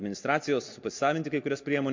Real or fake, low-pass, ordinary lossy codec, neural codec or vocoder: real; 7.2 kHz; MP3, 48 kbps; none